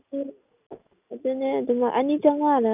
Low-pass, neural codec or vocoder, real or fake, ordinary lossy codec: 3.6 kHz; none; real; none